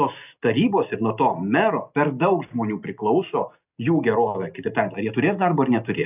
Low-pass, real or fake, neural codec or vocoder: 3.6 kHz; real; none